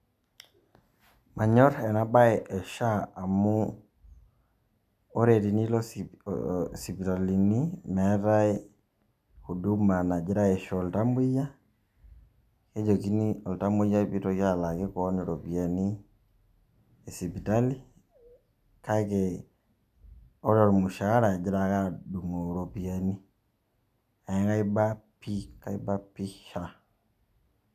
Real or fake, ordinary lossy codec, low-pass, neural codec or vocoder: real; none; 14.4 kHz; none